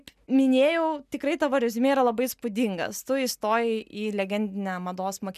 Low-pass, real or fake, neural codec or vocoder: 14.4 kHz; real; none